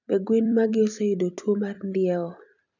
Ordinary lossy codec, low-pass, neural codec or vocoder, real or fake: none; 7.2 kHz; vocoder, 44.1 kHz, 128 mel bands every 256 samples, BigVGAN v2; fake